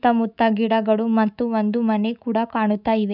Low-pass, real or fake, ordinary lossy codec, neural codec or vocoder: 5.4 kHz; fake; none; autoencoder, 48 kHz, 128 numbers a frame, DAC-VAE, trained on Japanese speech